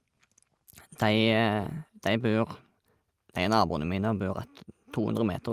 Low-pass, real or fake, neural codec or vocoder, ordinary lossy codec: 14.4 kHz; real; none; Opus, 32 kbps